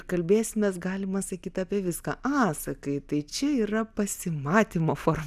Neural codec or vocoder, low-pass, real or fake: none; 14.4 kHz; real